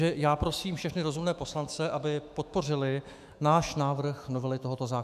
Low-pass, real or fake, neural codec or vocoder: 14.4 kHz; fake; autoencoder, 48 kHz, 128 numbers a frame, DAC-VAE, trained on Japanese speech